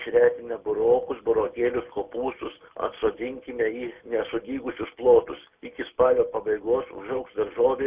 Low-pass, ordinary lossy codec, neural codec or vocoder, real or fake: 3.6 kHz; Opus, 64 kbps; none; real